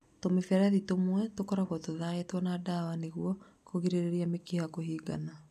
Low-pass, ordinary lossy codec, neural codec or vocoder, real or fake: 14.4 kHz; none; none; real